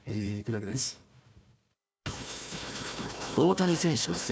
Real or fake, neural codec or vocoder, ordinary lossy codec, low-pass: fake; codec, 16 kHz, 1 kbps, FunCodec, trained on Chinese and English, 50 frames a second; none; none